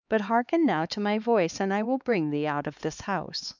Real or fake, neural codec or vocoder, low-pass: fake; codec, 16 kHz, 4 kbps, X-Codec, HuBERT features, trained on LibriSpeech; 7.2 kHz